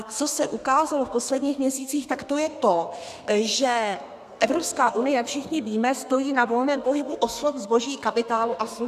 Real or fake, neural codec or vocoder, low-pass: fake; codec, 32 kHz, 1.9 kbps, SNAC; 14.4 kHz